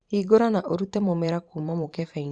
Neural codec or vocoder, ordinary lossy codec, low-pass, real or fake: vocoder, 44.1 kHz, 128 mel bands every 512 samples, BigVGAN v2; Opus, 64 kbps; 9.9 kHz; fake